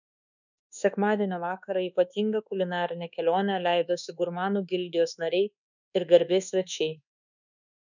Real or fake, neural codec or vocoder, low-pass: fake; codec, 24 kHz, 1.2 kbps, DualCodec; 7.2 kHz